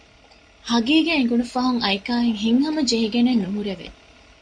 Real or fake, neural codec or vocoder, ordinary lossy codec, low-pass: real; none; MP3, 64 kbps; 9.9 kHz